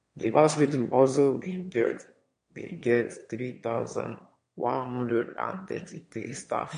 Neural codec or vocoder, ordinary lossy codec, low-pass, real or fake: autoencoder, 22.05 kHz, a latent of 192 numbers a frame, VITS, trained on one speaker; MP3, 48 kbps; 9.9 kHz; fake